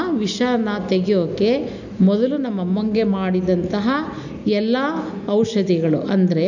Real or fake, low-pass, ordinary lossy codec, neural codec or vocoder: real; 7.2 kHz; none; none